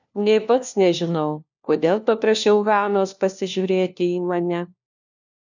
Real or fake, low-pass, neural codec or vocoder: fake; 7.2 kHz; codec, 16 kHz, 1 kbps, FunCodec, trained on LibriTTS, 50 frames a second